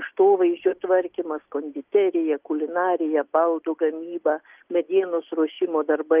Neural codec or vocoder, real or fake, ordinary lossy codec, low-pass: none; real; Opus, 24 kbps; 3.6 kHz